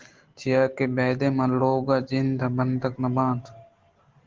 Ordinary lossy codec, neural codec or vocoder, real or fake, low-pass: Opus, 16 kbps; none; real; 7.2 kHz